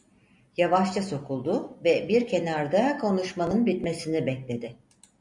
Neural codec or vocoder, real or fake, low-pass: none; real; 10.8 kHz